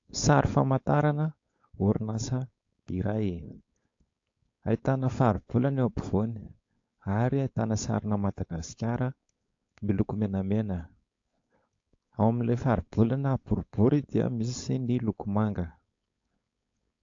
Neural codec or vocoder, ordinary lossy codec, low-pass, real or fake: codec, 16 kHz, 4.8 kbps, FACodec; AAC, 48 kbps; 7.2 kHz; fake